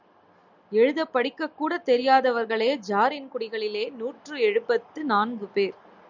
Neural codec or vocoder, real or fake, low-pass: none; real; 7.2 kHz